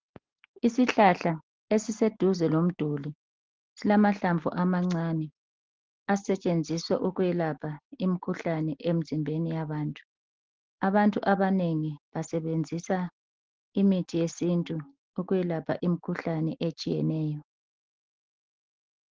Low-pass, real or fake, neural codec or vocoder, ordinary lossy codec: 7.2 kHz; real; none; Opus, 16 kbps